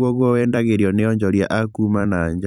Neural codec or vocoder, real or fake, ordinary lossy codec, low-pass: vocoder, 44.1 kHz, 128 mel bands every 256 samples, BigVGAN v2; fake; none; 19.8 kHz